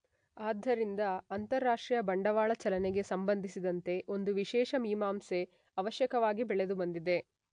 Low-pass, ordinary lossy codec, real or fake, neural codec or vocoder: 9.9 kHz; AAC, 64 kbps; real; none